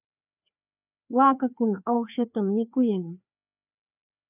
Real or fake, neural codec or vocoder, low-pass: fake; codec, 16 kHz, 2 kbps, FreqCodec, larger model; 3.6 kHz